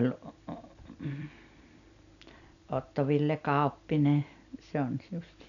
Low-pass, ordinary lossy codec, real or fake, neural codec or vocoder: 7.2 kHz; none; real; none